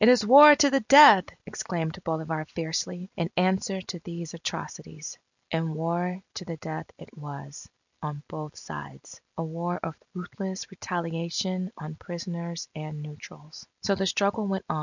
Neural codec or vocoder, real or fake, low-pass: none; real; 7.2 kHz